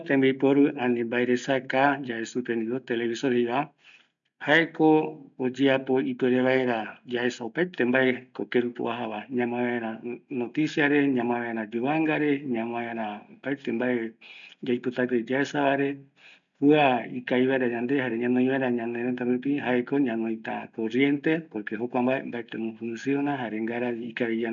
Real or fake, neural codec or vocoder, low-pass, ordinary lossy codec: real; none; 7.2 kHz; none